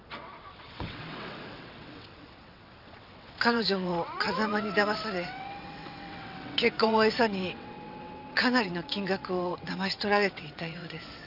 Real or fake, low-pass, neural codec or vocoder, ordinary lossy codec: fake; 5.4 kHz; vocoder, 22.05 kHz, 80 mel bands, WaveNeXt; none